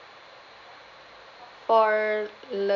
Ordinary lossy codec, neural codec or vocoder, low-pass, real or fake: none; none; 7.2 kHz; real